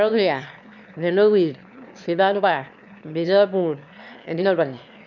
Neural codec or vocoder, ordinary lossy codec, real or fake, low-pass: autoencoder, 22.05 kHz, a latent of 192 numbers a frame, VITS, trained on one speaker; none; fake; 7.2 kHz